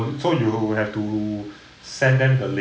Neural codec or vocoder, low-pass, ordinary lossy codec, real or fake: none; none; none; real